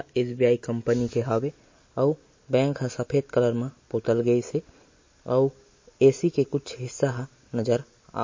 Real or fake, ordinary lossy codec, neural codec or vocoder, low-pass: real; MP3, 32 kbps; none; 7.2 kHz